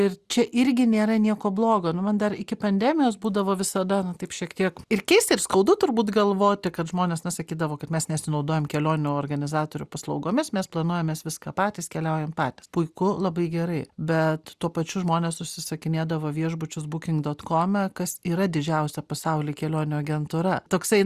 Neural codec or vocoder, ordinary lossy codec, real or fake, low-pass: none; Opus, 64 kbps; real; 14.4 kHz